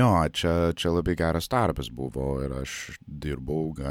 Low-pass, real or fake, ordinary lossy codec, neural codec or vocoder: 19.8 kHz; real; MP3, 96 kbps; none